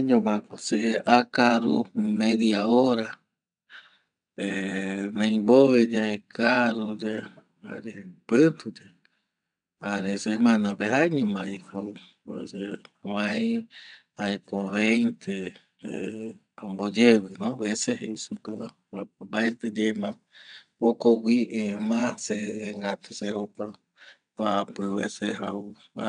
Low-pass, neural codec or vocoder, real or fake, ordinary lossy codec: 9.9 kHz; vocoder, 22.05 kHz, 80 mel bands, WaveNeXt; fake; none